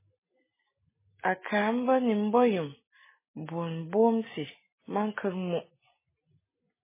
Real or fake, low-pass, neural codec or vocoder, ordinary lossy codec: real; 3.6 kHz; none; MP3, 16 kbps